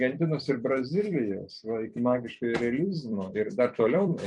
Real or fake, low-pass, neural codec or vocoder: fake; 10.8 kHz; vocoder, 44.1 kHz, 128 mel bands every 256 samples, BigVGAN v2